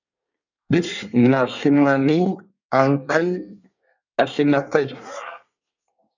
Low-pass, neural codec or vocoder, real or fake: 7.2 kHz; codec, 24 kHz, 1 kbps, SNAC; fake